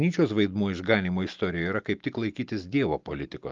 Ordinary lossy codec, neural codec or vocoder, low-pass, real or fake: Opus, 24 kbps; none; 7.2 kHz; real